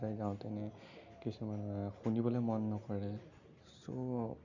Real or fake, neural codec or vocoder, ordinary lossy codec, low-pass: real; none; Opus, 64 kbps; 7.2 kHz